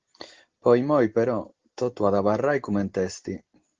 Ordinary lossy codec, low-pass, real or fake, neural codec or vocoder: Opus, 16 kbps; 7.2 kHz; real; none